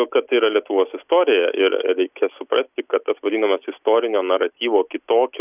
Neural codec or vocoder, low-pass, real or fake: none; 3.6 kHz; real